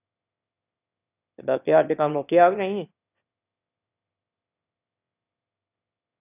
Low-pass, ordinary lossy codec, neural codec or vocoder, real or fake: 3.6 kHz; none; autoencoder, 22.05 kHz, a latent of 192 numbers a frame, VITS, trained on one speaker; fake